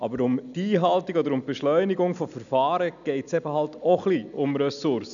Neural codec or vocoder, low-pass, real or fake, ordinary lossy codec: none; 7.2 kHz; real; none